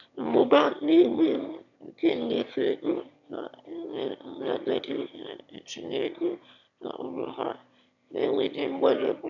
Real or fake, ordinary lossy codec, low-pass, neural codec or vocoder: fake; none; 7.2 kHz; autoencoder, 22.05 kHz, a latent of 192 numbers a frame, VITS, trained on one speaker